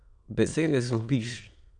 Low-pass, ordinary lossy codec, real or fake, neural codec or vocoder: 9.9 kHz; none; fake; autoencoder, 22.05 kHz, a latent of 192 numbers a frame, VITS, trained on many speakers